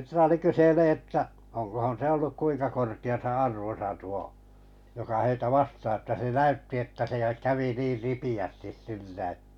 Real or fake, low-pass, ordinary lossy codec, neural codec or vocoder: real; 19.8 kHz; none; none